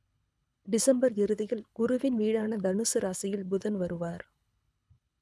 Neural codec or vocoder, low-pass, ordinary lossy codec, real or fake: codec, 24 kHz, 6 kbps, HILCodec; none; none; fake